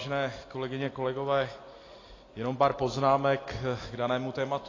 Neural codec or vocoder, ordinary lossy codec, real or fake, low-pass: none; AAC, 32 kbps; real; 7.2 kHz